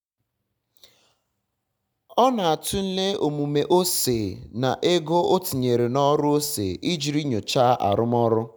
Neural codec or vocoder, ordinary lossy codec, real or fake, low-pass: none; none; real; none